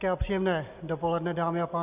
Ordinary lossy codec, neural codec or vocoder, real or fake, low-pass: Opus, 64 kbps; none; real; 3.6 kHz